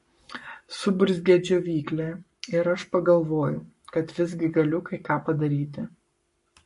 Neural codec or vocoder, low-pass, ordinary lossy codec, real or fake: codec, 44.1 kHz, 7.8 kbps, Pupu-Codec; 14.4 kHz; MP3, 48 kbps; fake